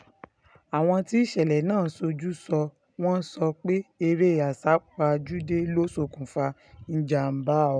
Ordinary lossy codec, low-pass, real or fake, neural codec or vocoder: none; 9.9 kHz; real; none